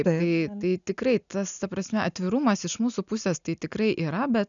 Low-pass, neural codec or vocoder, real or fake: 7.2 kHz; none; real